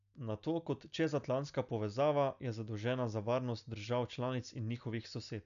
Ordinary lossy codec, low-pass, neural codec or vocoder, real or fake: none; 7.2 kHz; none; real